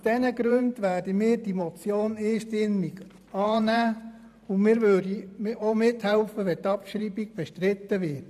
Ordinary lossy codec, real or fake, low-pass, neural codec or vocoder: none; fake; 14.4 kHz; vocoder, 44.1 kHz, 128 mel bands every 512 samples, BigVGAN v2